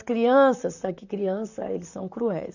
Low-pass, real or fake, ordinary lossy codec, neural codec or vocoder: 7.2 kHz; fake; none; vocoder, 44.1 kHz, 128 mel bands, Pupu-Vocoder